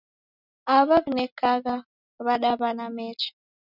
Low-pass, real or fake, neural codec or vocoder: 5.4 kHz; real; none